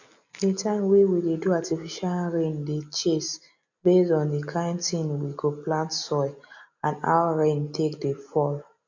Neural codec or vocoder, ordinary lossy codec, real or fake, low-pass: none; none; real; 7.2 kHz